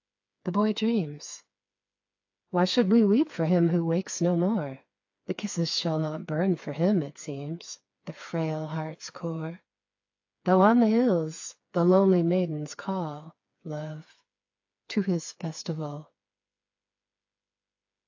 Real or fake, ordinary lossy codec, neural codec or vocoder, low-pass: fake; AAC, 48 kbps; codec, 16 kHz, 4 kbps, FreqCodec, smaller model; 7.2 kHz